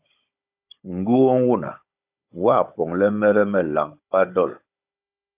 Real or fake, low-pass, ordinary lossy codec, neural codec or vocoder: fake; 3.6 kHz; AAC, 32 kbps; codec, 16 kHz, 16 kbps, FunCodec, trained on Chinese and English, 50 frames a second